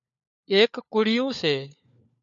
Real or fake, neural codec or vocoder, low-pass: fake; codec, 16 kHz, 4 kbps, FunCodec, trained on LibriTTS, 50 frames a second; 7.2 kHz